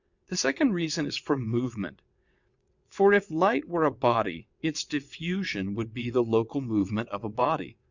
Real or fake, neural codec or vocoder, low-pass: fake; vocoder, 22.05 kHz, 80 mel bands, WaveNeXt; 7.2 kHz